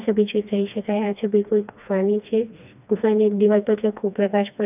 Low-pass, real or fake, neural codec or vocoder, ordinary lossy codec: 3.6 kHz; fake; codec, 16 kHz, 2 kbps, FreqCodec, smaller model; none